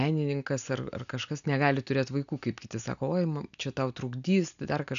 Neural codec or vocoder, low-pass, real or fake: none; 7.2 kHz; real